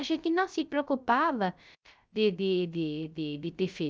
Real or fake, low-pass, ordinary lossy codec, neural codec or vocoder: fake; none; none; codec, 16 kHz, 0.3 kbps, FocalCodec